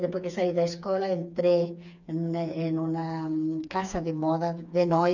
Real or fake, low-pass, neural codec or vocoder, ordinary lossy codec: fake; 7.2 kHz; codec, 16 kHz, 4 kbps, FreqCodec, smaller model; none